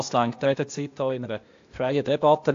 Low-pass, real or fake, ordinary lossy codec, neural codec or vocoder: 7.2 kHz; fake; AAC, 48 kbps; codec, 16 kHz, 0.8 kbps, ZipCodec